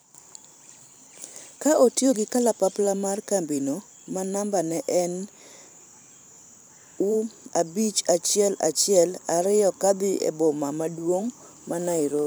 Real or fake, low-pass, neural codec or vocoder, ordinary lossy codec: fake; none; vocoder, 44.1 kHz, 128 mel bands every 512 samples, BigVGAN v2; none